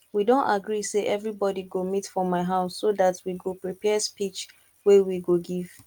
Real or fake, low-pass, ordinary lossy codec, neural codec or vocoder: real; 19.8 kHz; Opus, 32 kbps; none